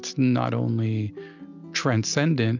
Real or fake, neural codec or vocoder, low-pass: real; none; 7.2 kHz